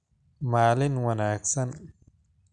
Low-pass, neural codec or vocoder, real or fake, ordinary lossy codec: 9.9 kHz; none; real; none